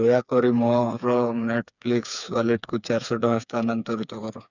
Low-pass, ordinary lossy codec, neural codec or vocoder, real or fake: 7.2 kHz; none; codec, 16 kHz, 4 kbps, FreqCodec, smaller model; fake